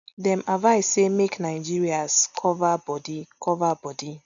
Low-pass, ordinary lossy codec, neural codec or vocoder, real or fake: 7.2 kHz; none; none; real